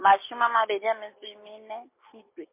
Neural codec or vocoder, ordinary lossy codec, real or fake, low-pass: none; MP3, 24 kbps; real; 3.6 kHz